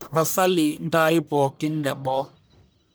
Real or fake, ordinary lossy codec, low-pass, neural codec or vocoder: fake; none; none; codec, 44.1 kHz, 1.7 kbps, Pupu-Codec